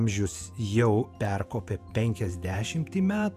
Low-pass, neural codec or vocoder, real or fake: 14.4 kHz; vocoder, 48 kHz, 128 mel bands, Vocos; fake